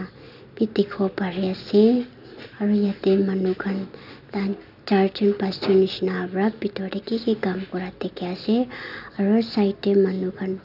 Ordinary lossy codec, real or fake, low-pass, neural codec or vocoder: none; real; 5.4 kHz; none